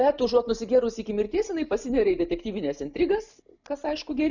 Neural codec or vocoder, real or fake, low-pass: none; real; 7.2 kHz